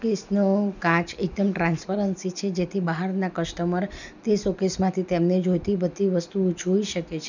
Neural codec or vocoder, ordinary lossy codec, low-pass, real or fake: none; none; 7.2 kHz; real